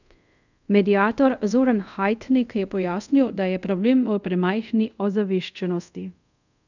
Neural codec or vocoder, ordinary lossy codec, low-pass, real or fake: codec, 24 kHz, 0.5 kbps, DualCodec; none; 7.2 kHz; fake